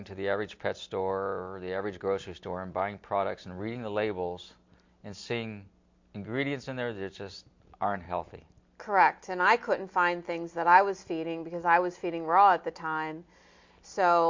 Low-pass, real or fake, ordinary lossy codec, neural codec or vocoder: 7.2 kHz; real; MP3, 48 kbps; none